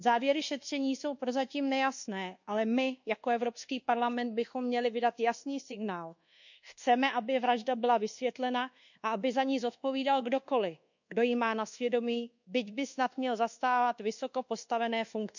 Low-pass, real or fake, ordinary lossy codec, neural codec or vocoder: 7.2 kHz; fake; none; codec, 24 kHz, 1.2 kbps, DualCodec